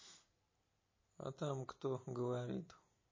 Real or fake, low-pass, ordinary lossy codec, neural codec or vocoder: real; 7.2 kHz; MP3, 32 kbps; none